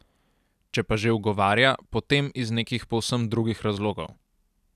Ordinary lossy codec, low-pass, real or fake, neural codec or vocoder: none; 14.4 kHz; real; none